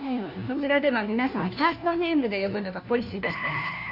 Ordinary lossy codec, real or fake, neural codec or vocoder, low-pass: none; fake; codec, 16 kHz, 1 kbps, FunCodec, trained on LibriTTS, 50 frames a second; 5.4 kHz